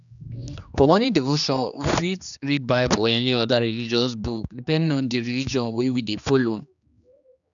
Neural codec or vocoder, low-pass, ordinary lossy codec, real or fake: codec, 16 kHz, 2 kbps, X-Codec, HuBERT features, trained on general audio; 7.2 kHz; none; fake